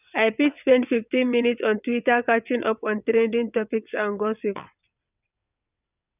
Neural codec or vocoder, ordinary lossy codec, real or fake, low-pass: vocoder, 22.05 kHz, 80 mel bands, WaveNeXt; none; fake; 3.6 kHz